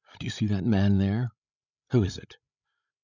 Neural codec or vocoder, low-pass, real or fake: codec, 16 kHz, 16 kbps, FreqCodec, larger model; 7.2 kHz; fake